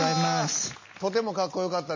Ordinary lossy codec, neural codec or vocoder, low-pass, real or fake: MP3, 32 kbps; none; 7.2 kHz; real